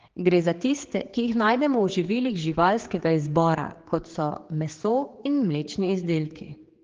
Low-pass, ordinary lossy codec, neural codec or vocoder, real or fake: 7.2 kHz; Opus, 16 kbps; codec, 16 kHz, 4 kbps, X-Codec, HuBERT features, trained on general audio; fake